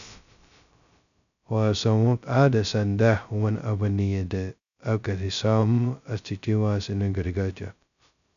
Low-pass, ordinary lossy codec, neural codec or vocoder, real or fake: 7.2 kHz; none; codec, 16 kHz, 0.2 kbps, FocalCodec; fake